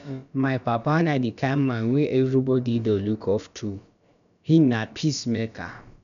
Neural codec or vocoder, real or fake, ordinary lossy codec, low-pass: codec, 16 kHz, about 1 kbps, DyCAST, with the encoder's durations; fake; none; 7.2 kHz